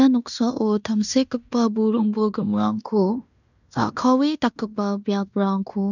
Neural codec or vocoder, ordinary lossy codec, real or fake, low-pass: codec, 16 kHz in and 24 kHz out, 0.9 kbps, LongCat-Audio-Codec, fine tuned four codebook decoder; none; fake; 7.2 kHz